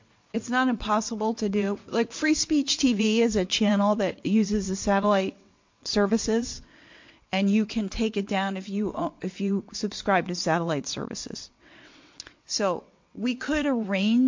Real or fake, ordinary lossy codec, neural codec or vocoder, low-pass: fake; MP3, 48 kbps; vocoder, 22.05 kHz, 80 mel bands, WaveNeXt; 7.2 kHz